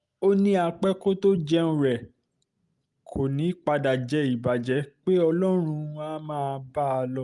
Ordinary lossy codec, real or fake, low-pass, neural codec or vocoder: Opus, 32 kbps; real; 10.8 kHz; none